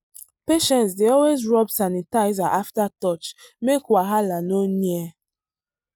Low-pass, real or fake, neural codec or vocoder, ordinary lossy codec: none; real; none; none